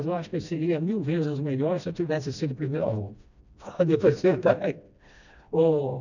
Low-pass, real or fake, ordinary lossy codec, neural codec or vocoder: 7.2 kHz; fake; none; codec, 16 kHz, 1 kbps, FreqCodec, smaller model